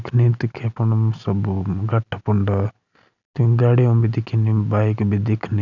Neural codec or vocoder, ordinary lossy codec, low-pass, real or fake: none; none; 7.2 kHz; real